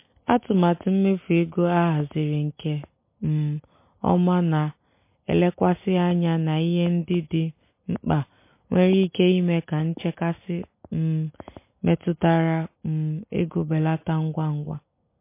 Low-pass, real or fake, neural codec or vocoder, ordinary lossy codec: 3.6 kHz; real; none; MP3, 24 kbps